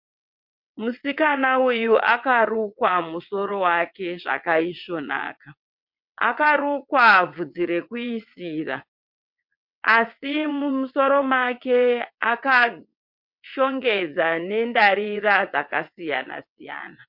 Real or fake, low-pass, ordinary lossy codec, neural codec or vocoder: fake; 5.4 kHz; MP3, 48 kbps; vocoder, 22.05 kHz, 80 mel bands, WaveNeXt